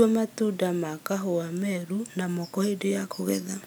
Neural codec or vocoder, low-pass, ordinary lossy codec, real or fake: none; none; none; real